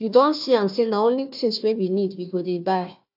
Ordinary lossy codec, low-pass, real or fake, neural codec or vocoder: AAC, 48 kbps; 5.4 kHz; fake; codec, 16 kHz, 1 kbps, FunCodec, trained on Chinese and English, 50 frames a second